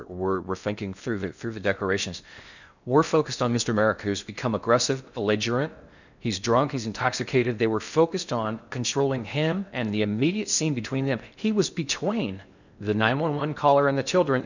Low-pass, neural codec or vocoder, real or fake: 7.2 kHz; codec, 16 kHz in and 24 kHz out, 0.6 kbps, FocalCodec, streaming, 4096 codes; fake